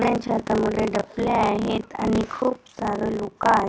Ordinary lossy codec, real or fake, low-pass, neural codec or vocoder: none; real; none; none